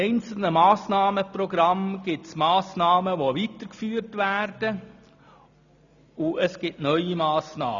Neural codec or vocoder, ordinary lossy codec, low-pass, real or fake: none; none; 7.2 kHz; real